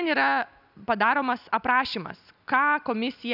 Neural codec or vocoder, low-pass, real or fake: none; 5.4 kHz; real